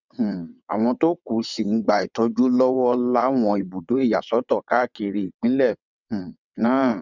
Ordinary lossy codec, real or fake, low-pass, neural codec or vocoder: none; fake; 7.2 kHz; vocoder, 22.05 kHz, 80 mel bands, WaveNeXt